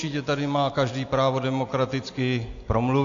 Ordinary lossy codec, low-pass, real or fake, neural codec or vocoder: AAC, 48 kbps; 7.2 kHz; real; none